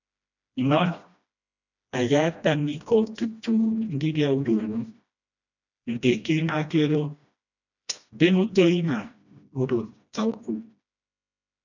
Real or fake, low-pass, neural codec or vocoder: fake; 7.2 kHz; codec, 16 kHz, 1 kbps, FreqCodec, smaller model